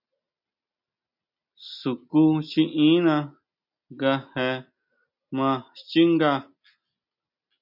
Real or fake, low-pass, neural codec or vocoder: real; 5.4 kHz; none